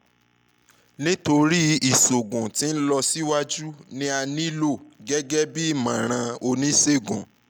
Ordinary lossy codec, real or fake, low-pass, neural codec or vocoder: none; real; none; none